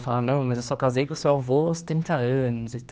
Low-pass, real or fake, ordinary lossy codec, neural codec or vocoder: none; fake; none; codec, 16 kHz, 2 kbps, X-Codec, HuBERT features, trained on general audio